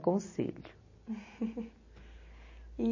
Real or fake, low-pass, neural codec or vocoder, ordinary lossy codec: real; 7.2 kHz; none; MP3, 32 kbps